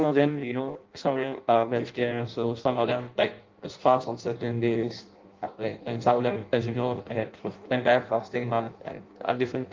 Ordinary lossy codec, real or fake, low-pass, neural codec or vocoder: Opus, 32 kbps; fake; 7.2 kHz; codec, 16 kHz in and 24 kHz out, 0.6 kbps, FireRedTTS-2 codec